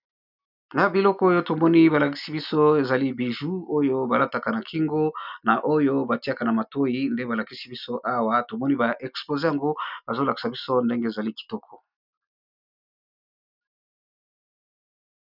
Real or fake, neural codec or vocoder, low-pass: real; none; 5.4 kHz